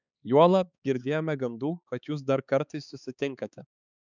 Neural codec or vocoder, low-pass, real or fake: codec, 16 kHz, 4 kbps, X-Codec, HuBERT features, trained on balanced general audio; 7.2 kHz; fake